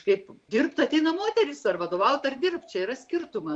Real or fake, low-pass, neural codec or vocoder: fake; 9.9 kHz; vocoder, 44.1 kHz, 128 mel bands every 512 samples, BigVGAN v2